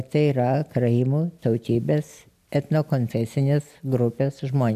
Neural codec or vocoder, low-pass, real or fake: none; 14.4 kHz; real